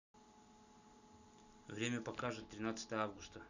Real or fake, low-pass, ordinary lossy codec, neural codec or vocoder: real; 7.2 kHz; none; none